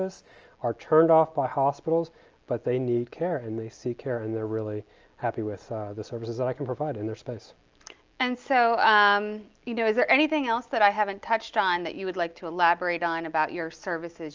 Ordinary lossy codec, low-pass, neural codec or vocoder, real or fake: Opus, 24 kbps; 7.2 kHz; none; real